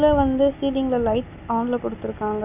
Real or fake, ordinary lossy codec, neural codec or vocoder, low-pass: real; none; none; 3.6 kHz